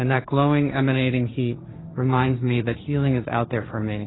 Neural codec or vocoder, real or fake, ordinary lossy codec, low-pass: codec, 16 kHz, 1.1 kbps, Voila-Tokenizer; fake; AAC, 16 kbps; 7.2 kHz